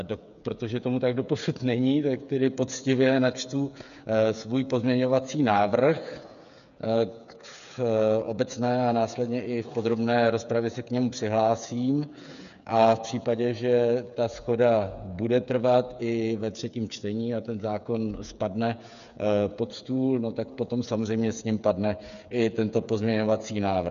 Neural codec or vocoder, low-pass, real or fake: codec, 16 kHz, 8 kbps, FreqCodec, smaller model; 7.2 kHz; fake